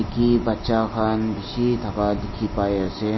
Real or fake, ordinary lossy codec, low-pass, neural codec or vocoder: real; MP3, 24 kbps; 7.2 kHz; none